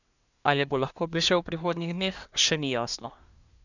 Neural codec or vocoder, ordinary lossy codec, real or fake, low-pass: codec, 24 kHz, 1 kbps, SNAC; none; fake; 7.2 kHz